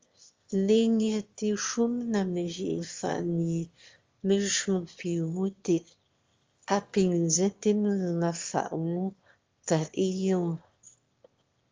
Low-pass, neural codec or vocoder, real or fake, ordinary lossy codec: 7.2 kHz; autoencoder, 22.05 kHz, a latent of 192 numbers a frame, VITS, trained on one speaker; fake; Opus, 32 kbps